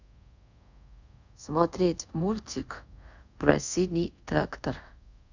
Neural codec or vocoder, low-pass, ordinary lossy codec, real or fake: codec, 24 kHz, 0.5 kbps, DualCodec; 7.2 kHz; none; fake